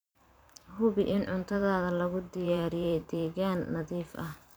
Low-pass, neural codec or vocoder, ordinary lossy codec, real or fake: none; vocoder, 44.1 kHz, 128 mel bands every 512 samples, BigVGAN v2; none; fake